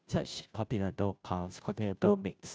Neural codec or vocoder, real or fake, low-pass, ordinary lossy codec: codec, 16 kHz, 0.5 kbps, FunCodec, trained on Chinese and English, 25 frames a second; fake; none; none